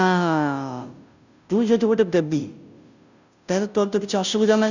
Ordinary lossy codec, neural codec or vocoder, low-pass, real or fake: none; codec, 16 kHz, 0.5 kbps, FunCodec, trained on Chinese and English, 25 frames a second; 7.2 kHz; fake